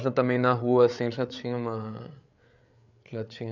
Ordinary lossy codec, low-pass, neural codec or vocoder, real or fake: none; 7.2 kHz; codec, 16 kHz, 8 kbps, FreqCodec, larger model; fake